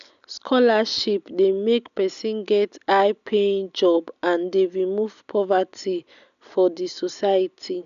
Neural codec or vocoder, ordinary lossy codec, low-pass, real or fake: none; none; 7.2 kHz; real